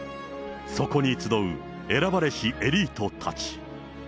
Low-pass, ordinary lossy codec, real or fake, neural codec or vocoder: none; none; real; none